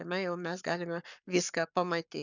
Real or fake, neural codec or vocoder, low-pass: fake; codec, 44.1 kHz, 7.8 kbps, Pupu-Codec; 7.2 kHz